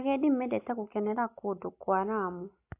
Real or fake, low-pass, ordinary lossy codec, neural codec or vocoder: real; 3.6 kHz; AAC, 24 kbps; none